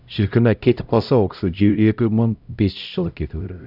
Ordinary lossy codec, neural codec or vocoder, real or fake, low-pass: none; codec, 16 kHz, 0.5 kbps, X-Codec, HuBERT features, trained on LibriSpeech; fake; 5.4 kHz